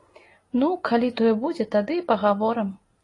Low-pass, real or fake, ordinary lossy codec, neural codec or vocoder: 10.8 kHz; real; AAC, 32 kbps; none